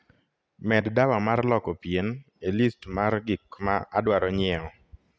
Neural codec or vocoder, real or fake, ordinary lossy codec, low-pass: none; real; none; none